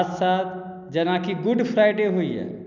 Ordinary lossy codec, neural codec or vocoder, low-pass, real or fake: none; none; 7.2 kHz; real